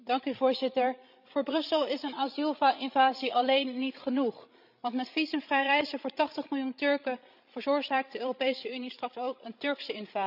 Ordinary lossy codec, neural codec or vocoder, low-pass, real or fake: none; codec, 16 kHz, 16 kbps, FreqCodec, larger model; 5.4 kHz; fake